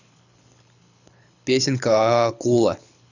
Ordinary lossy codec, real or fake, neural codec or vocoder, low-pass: none; fake; codec, 24 kHz, 6 kbps, HILCodec; 7.2 kHz